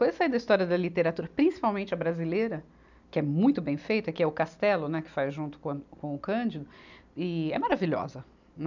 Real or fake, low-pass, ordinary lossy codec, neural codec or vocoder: real; 7.2 kHz; none; none